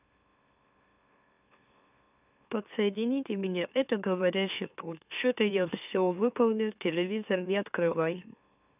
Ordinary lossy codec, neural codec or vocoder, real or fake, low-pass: none; autoencoder, 44.1 kHz, a latent of 192 numbers a frame, MeloTTS; fake; 3.6 kHz